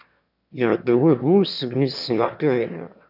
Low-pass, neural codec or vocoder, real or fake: 5.4 kHz; autoencoder, 22.05 kHz, a latent of 192 numbers a frame, VITS, trained on one speaker; fake